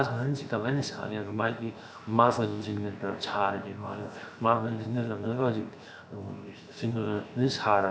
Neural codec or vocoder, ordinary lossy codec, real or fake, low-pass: codec, 16 kHz, 0.7 kbps, FocalCodec; none; fake; none